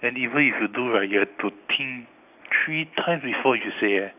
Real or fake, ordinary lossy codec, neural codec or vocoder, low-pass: real; none; none; 3.6 kHz